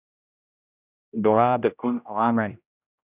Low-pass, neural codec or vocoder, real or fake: 3.6 kHz; codec, 16 kHz, 0.5 kbps, X-Codec, HuBERT features, trained on general audio; fake